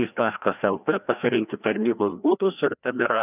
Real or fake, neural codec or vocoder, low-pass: fake; codec, 16 kHz, 1 kbps, FreqCodec, larger model; 3.6 kHz